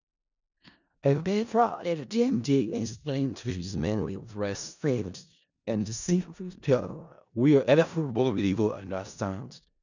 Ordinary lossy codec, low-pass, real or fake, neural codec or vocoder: none; 7.2 kHz; fake; codec, 16 kHz in and 24 kHz out, 0.4 kbps, LongCat-Audio-Codec, four codebook decoder